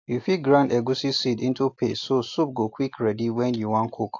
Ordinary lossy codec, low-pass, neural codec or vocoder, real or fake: AAC, 48 kbps; 7.2 kHz; none; real